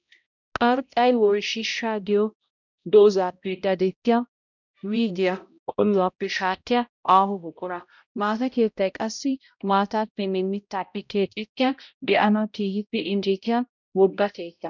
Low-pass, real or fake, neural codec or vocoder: 7.2 kHz; fake; codec, 16 kHz, 0.5 kbps, X-Codec, HuBERT features, trained on balanced general audio